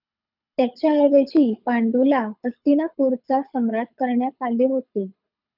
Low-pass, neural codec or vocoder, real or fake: 5.4 kHz; codec, 24 kHz, 6 kbps, HILCodec; fake